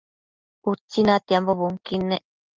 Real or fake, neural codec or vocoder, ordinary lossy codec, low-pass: real; none; Opus, 24 kbps; 7.2 kHz